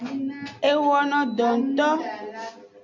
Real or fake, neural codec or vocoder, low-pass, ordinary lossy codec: real; none; 7.2 kHz; MP3, 64 kbps